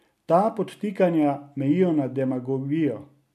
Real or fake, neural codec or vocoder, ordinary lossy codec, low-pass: real; none; none; 14.4 kHz